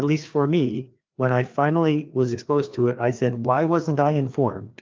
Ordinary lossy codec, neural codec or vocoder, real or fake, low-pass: Opus, 24 kbps; codec, 16 kHz, 2 kbps, FreqCodec, larger model; fake; 7.2 kHz